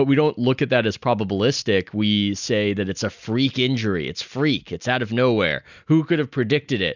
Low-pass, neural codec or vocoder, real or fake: 7.2 kHz; none; real